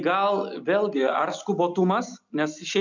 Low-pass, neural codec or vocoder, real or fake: 7.2 kHz; none; real